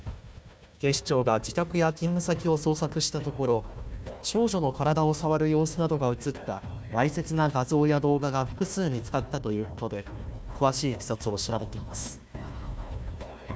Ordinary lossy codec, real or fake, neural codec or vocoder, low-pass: none; fake; codec, 16 kHz, 1 kbps, FunCodec, trained on Chinese and English, 50 frames a second; none